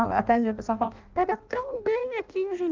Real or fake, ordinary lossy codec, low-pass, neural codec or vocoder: fake; Opus, 32 kbps; 7.2 kHz; codec, 16 kHz in and 24 kHz out, 0.6 kbps, FireRedTTS-2 codec